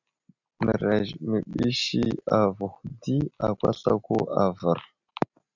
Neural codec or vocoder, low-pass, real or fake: none; 7.2 kHz; real